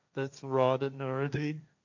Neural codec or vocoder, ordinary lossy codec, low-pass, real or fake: codec, 16 kHz, 1.1 kbps, Voila-Tokenizer; none; 7.2 kHz; fake